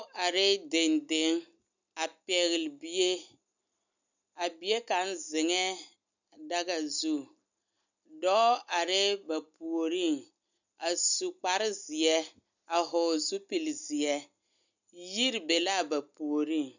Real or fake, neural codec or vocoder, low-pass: real; none; 7.2 kHz